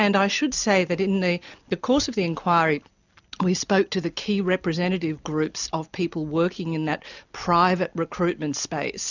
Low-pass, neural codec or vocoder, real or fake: 7.2 kHz; none; real